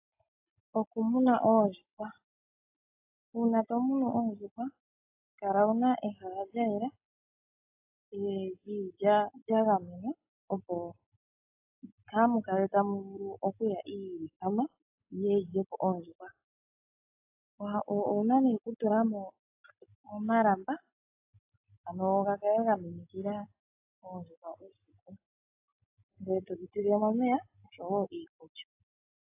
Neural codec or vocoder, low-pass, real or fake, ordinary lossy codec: none; 3.6 kHz; real; AAC, 32 kbps